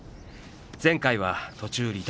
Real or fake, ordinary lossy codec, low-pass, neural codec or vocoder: real; none; none; none